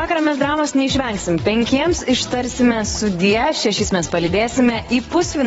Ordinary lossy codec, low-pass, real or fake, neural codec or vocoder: AAC, 24 kbps; 9.9 kHz; fake; vocoder, 22.05 kHz, 80 mel bands, WaveNeXt